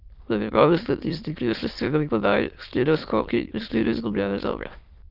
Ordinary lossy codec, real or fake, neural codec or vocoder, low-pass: Opus, 32 kbps; fake; autoencoder, 22.05 kHz, a latent of 192 numbers a frame, VITS, trained on many speakers; 5.4 kHz